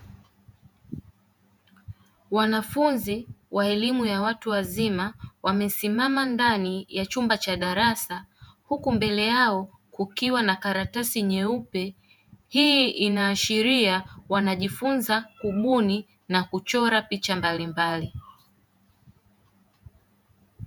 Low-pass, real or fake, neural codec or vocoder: 19.8 kHz; fake; vocoder, 48 kHz, 128 mel bands, Vocos